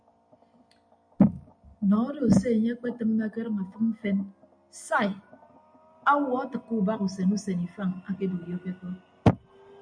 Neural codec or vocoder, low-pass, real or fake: none; 9.9 kHz; real